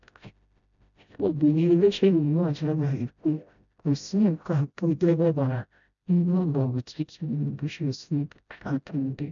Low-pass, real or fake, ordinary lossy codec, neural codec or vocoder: 7.2 kHz; fake; none; codec, 16 kHz, 0.5 kbps, FreqCodec, smaller model